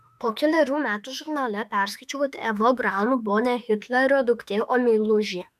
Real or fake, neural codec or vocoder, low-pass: fake; autoencoder, 48 kHz, 32 numbers a frame, DAC-VAE, trained on Japanese speech; 14.4 kHz